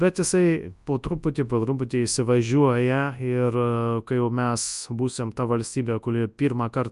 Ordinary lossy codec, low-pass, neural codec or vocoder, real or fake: Opus, 64 kbps; 10.8 kHz; codec, 24 kHz, 0.9 kbps, WavTokenizer, large speech release; fake